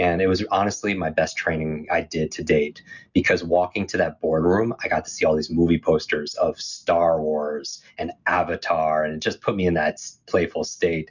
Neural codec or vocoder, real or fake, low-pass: none; real; 7.2 kHz